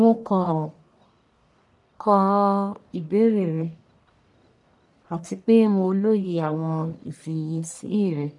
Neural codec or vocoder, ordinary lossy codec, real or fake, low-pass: codec, 44.1 kHz, 1.7 kbps, Pupu-Codec; none; fake; 10.8 kHz